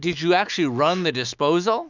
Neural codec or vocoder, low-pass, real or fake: autoencoder, 48 kHz, 128 numbers a frame, DAC-VAE, trained on Japanese speech; 7.2 kHz; fake